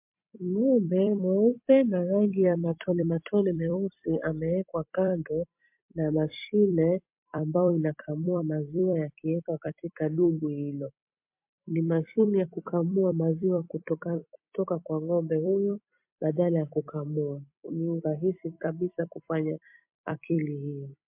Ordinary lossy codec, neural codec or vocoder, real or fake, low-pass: MP3, 32 kbps; vocoder, 44.1 kHz, 128 mel bands every 256 samples, BigVGAN v2; fake; 3.6 kHz